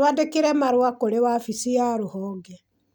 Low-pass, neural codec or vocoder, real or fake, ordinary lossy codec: none; none; real; none